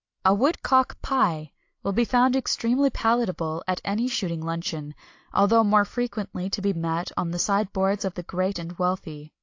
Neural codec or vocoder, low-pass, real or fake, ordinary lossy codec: none; 7.2 kHz; real; AAC, 48 kbps